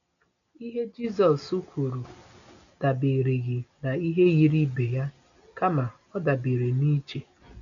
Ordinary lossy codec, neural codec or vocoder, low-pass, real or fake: none; none; 7.2 kHz; real